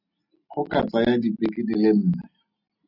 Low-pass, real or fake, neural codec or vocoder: 5.4 kHz; real; none